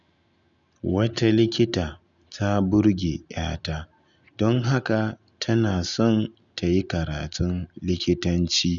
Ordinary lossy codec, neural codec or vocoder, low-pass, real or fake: none; none; 7.2 kHz; real